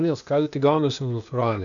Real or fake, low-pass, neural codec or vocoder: fake; 7.2 kHz; codec, 16 kHz, 0.8 kbps, ZipCodec